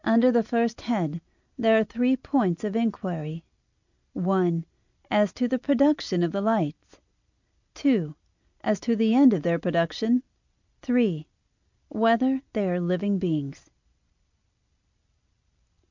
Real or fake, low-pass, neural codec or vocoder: real; 7.2 kHz; none